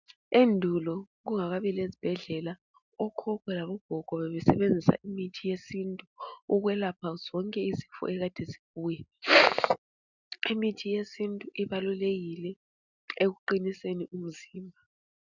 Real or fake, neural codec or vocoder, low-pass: real; none; 7.2 kHz